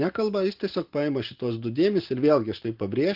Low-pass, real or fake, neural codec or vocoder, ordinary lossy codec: 5.4 kHz; real; none; Opus, 16 kbps